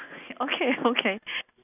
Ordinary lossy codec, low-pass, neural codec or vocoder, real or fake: none; 3.6 kHz; none; real